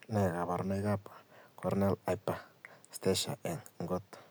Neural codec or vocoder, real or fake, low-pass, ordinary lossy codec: none; real; none; none